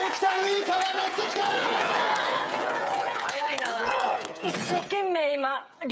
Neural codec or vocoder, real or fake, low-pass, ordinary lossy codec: codec, 16 kHz, 8 kbps, FreqCodec, smaller model; fake; none; none